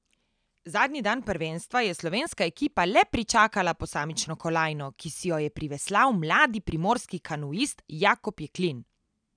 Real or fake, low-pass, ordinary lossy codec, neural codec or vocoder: real; 9.9 kHz; none; none